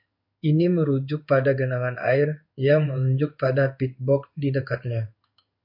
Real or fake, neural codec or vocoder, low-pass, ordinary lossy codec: fake; codec, 16 kHz in and 24 kHz out, 1 kbps, XY-Tokenizer; 5.4 kHz; MP3, 48 kbps